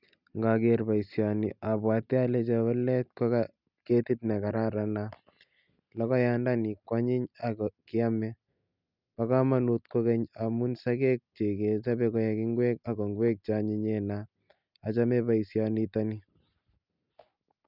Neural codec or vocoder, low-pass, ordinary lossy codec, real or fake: none; 5.4 kHz; none; real